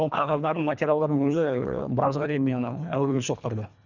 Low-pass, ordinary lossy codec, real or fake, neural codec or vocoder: 7.2 kHz; none; fake; codec, 24 kHz, 1.5 kbps, HILCodec